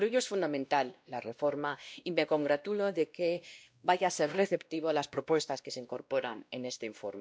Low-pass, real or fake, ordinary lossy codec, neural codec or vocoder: none; fake; none; codec, 16 kHz, 1 kbps, X-Codec, WavLM features, trained on Multilingual LibriSpeech